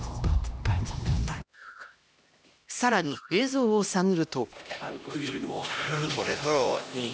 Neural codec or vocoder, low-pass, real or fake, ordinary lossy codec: codec, 16 kHz, 1 kbps, X-Codec, HuBERT features, trained on LibriSpeech; none; fake; none